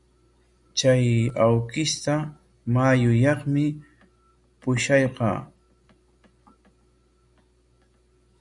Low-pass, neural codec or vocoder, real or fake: 10.8 kHz; none; real